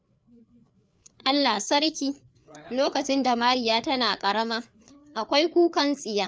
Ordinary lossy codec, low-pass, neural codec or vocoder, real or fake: none; none; codec, 16 kHz, 4 kbps, FreqCodec, larger model; fake